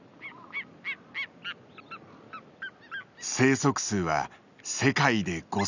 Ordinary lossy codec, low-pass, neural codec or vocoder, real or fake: none; 7.2 kHz; none; real